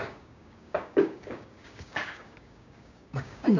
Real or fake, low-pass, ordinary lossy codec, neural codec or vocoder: fake; 7.2 kHz; none; codec, 16 kHz, 6 kbps, DAC